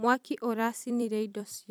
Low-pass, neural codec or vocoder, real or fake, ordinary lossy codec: none; vocoder, 44.1 kHz, 128 mel bands every 256 samples, BigVGAN v2; fake; none